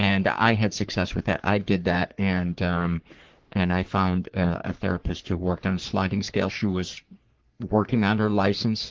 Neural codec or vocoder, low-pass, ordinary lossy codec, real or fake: codec, 44.1 kHz, 3.4 kbps, Pupu-Codec; 7.2 kHz; Opus, 16 kbps; fake